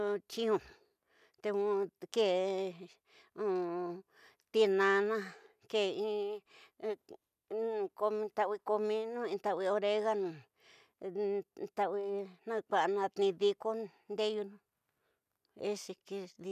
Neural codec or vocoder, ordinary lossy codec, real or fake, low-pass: none; none; real; 14.4 kHz